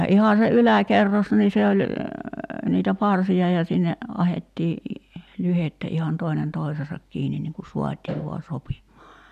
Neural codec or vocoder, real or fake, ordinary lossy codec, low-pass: none; real; none; 14.4 kHz